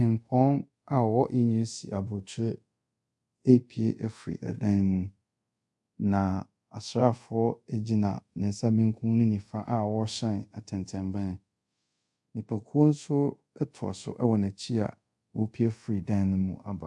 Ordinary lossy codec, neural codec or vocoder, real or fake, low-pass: MP3, 64 kbps; codec, 24 kHz, 0.5 kbps, DualCodec; fake; 10.8 kHz